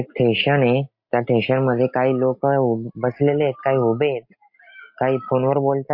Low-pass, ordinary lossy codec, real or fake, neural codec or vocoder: 5.4 kHz; MP3, 32 kbps; real; none